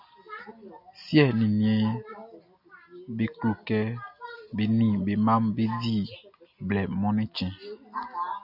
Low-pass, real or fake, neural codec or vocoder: 5.4 kHz; real; none